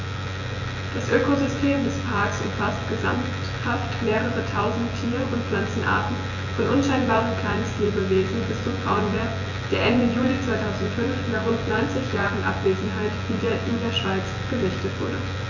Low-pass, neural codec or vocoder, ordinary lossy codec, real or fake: 7.2 kHz; vocoder, 24 kHz, 100 mel bands, Vocos; none; fake